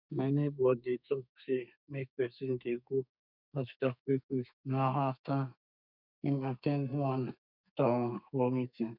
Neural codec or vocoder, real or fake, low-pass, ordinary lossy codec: codec, 32 kHz, 1.9 kbps, SNAC; fake; 5.4 kHz; none